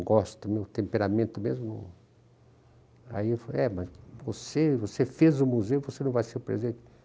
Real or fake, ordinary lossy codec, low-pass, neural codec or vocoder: real; none; none; none